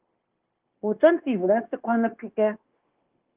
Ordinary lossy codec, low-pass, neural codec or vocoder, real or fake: Opus, 32 kbps; 3.6 kHz; codec, 16 kHz, 0.9 kbps, LongCat-Audio-Codec; fake